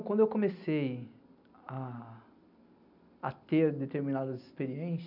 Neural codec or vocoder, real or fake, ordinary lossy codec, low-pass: none; real; none; 5.4 kHz